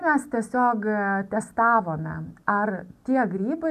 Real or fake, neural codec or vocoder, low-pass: real; none; 14.4 kHz